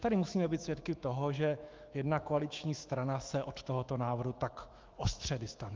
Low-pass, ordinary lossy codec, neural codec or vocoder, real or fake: 7.2 kHz; Opus, 24 kbps; autoencoder, 48 kHz, 128 numbers a frame, DAC-VAE, trained on Japanese speech; fake